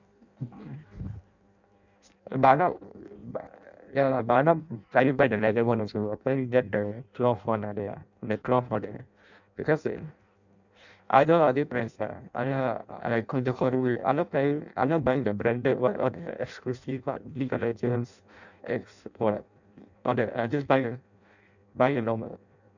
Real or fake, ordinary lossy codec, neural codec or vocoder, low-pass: fake; none; codec, 16 kHz in and 24 kHz out, 0.6 kbps, FireRedTTS-2 codec; 7.2 kHz